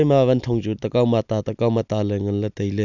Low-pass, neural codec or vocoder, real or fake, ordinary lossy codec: 7.2 kHz; none; real; none